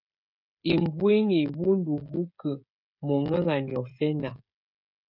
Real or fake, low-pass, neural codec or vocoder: fake; 5.4 kHz; codec, 16 kHz, 16 kbps, FreqCodec, smaller model